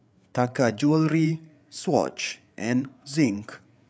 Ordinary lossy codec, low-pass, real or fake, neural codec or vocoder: none; none; fake; codec, 16 kHz, 8 kbps, FreqCodec, larger model